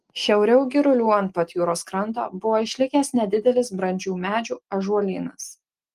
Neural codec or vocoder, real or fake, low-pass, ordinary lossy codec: none; real; 14.4 kHz; Opus, 24 kbps